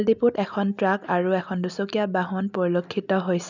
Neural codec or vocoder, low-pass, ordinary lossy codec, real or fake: none; 7.2 kHz; none; real